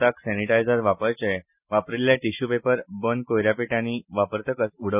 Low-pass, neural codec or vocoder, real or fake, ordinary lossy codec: 3.6 kHz; none; real; none